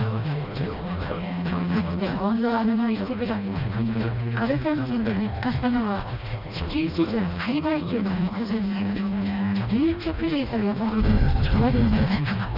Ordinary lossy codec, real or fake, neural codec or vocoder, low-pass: none; fake; codec, 16 kHz, 1 kbps, FreqCodec, smaller model; 5.4 kHz